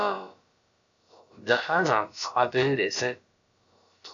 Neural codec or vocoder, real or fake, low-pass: codec, 16 kHz, about 1 kbps, DyCAST, with the encoder's durations; fake; 7.2 kHz